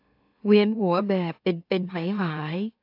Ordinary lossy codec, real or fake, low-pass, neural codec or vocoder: AAC, 32 kbps; fake; 5.4 kHz; autoencoder, 44.1 kHz, a latent of 192 numbers a frame, MeloTTS